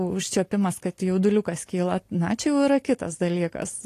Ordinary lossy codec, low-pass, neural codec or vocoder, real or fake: AAC, 48 kbps; 14.4 kHz; none; real